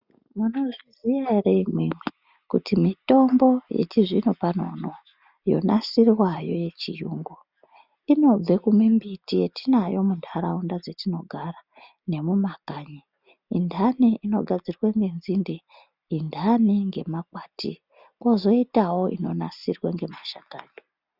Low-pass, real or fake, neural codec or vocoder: 5.4 kHz; real; none